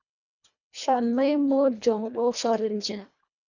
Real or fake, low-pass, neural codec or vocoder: fake; 7.2 kHz; codec, 24 kHz, 1.5 kbps, HILCodec